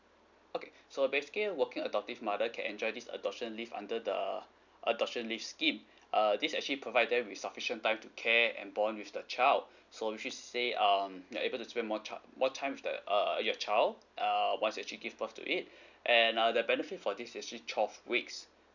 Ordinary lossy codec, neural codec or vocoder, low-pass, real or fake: none; none; 7.2 kHz; real